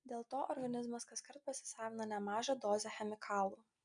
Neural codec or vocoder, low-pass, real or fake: none; 9.9 kHz; real